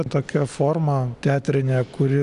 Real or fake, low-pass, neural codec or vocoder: real; 10.8 kHz; none